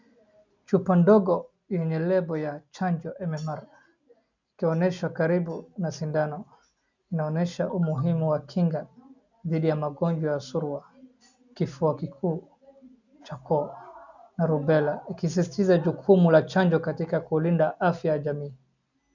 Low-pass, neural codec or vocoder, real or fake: 7.2 kHz; none; real